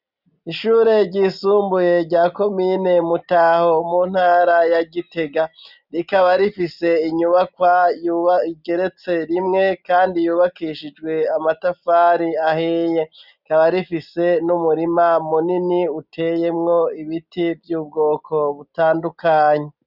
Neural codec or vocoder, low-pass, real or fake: none; 5.4 kHz; real